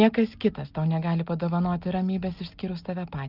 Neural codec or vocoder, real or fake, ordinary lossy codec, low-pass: none; real; Opus, 32 kbps; 5.4 kHz